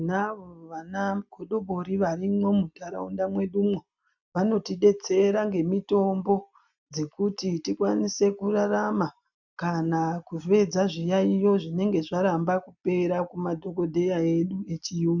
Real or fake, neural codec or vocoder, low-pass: real; none; 7.2 kHz